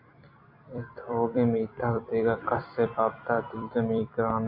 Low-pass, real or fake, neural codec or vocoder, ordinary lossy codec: 5.4 kHz; fake; vocoder, 44.1 kHz, 128 mel bands every 256 samples, BigVGAN v2; AAC, 48 kbps